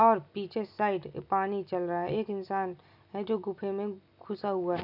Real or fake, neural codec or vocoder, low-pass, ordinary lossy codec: real; none; 5.4 kHz; none